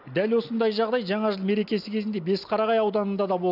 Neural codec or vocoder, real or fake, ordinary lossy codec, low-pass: none; real; none; 5.4 kHz